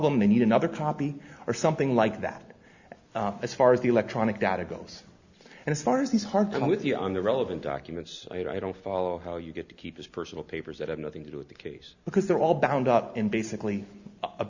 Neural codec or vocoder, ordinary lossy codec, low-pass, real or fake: none; Opus, 64 kbps; 7.2 kHz; real